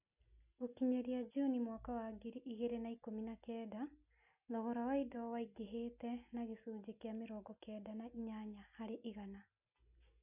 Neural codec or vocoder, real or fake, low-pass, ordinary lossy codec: none; real; 3.6 kHz; none